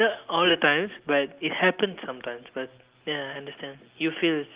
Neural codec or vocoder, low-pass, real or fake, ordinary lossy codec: none; 3.6 kHz; real; Opus, 32 kbps